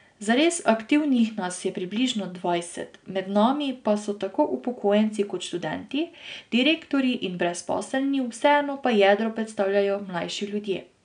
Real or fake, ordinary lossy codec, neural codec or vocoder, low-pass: real; none; none; 9.9 kHz